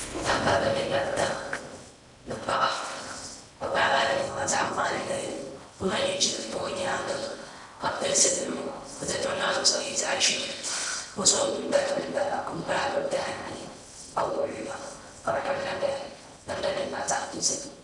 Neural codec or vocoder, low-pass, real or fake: codec, 16 kHz in and 24 kHz out, 0.6 kbps, FocalCodec, streaming, 4096 codes; 10.8 kHz; fake